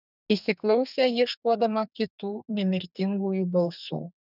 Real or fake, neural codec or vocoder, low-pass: fake; codec, 32 kHz, 1.9 kbps, SNAC; 5.4 kHz